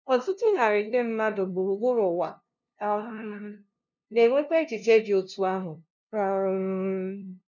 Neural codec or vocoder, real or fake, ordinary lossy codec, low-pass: codec, 16 kHz, 0.5 kbps, FunCodec, trained on LibriTTS, 25 frames a second; fake; none; 7.2 kHz